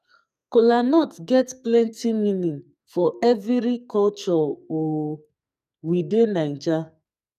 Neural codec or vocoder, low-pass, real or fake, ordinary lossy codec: codec, 44.1 kHz, 2.6 kbps, SNAC; 14.4 kHz; fake; none